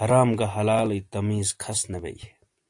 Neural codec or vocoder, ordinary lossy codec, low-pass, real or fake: none; AAC, 64 kbps; 10.8 kHz; real